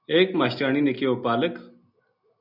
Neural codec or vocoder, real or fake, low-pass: none; real; 5.4 kHz